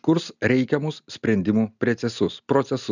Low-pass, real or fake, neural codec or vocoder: 7.2 kHz; real; none